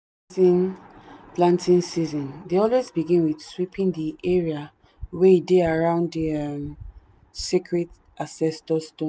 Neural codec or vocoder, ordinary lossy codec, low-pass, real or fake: none; none; none; real